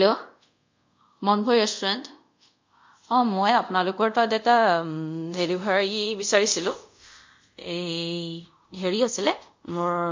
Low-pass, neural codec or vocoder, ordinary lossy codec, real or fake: 7.2 kHz; codec, 24 kHz, 0.5 kbps, DualCodec; MP3, 48 kbps; fake